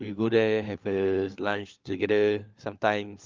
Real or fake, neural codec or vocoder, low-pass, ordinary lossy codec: fake; codec, 16 kHz, 4 kbps, FunCodec, trained on LibriTTS, 50 frames a second; 7.2 kHz; Opus, 32 kbps